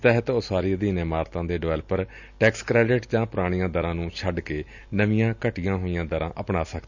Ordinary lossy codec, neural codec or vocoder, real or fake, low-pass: none; none; real; 7.2 kHz